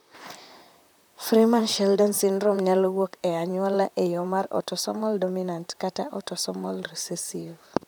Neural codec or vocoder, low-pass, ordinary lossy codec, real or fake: vocoder, 44.1 kHz, 128 mel bands, Pupu-Vocoder; none; none; fake